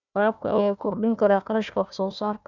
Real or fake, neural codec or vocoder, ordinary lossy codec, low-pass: fake; codec, 16 kHz, 1 kbps, FunCodec, trained on Chinese and English, 50 frames a second; none; 7.2 kHz